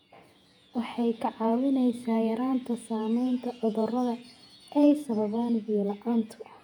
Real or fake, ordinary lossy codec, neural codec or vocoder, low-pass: fake; none; vocoder, 48 kHz, 128 mel bands, Vocos; 19.8 kHz